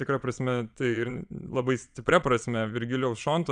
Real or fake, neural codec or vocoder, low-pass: fake; vocoder, 22.05 kHz, 80 mel bands, Vocos; 9.9 kHz